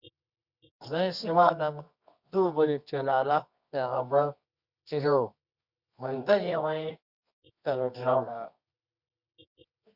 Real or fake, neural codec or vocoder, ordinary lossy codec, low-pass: fake; codec, 24 kHz, 0.9 kbps, WavTokenizer, medium music audio release; Opus, 64 kbps; 5.4 kHz